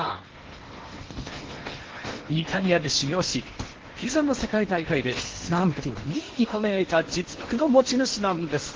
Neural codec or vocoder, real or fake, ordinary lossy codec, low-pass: codec, 16 kHz in and 24 kHz out, 0.8 kbps, FocalCodec, streaming, 65536 codes; fake; Opus, 16 kbps; 7.2 kHz